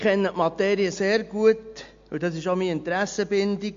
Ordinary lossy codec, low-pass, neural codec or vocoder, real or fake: MP3, 48 kbps; 7.2 kHz; none; real